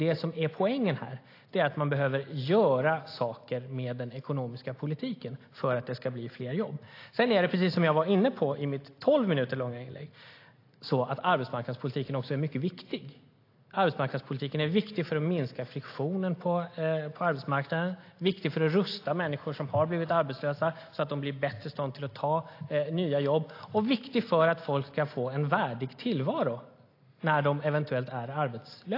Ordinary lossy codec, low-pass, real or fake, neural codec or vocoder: AAC, 32 kbps; 5.4 kHz; real; none